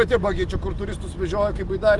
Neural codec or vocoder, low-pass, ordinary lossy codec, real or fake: none; 10.8 kHz; Opus, 24 kbps; real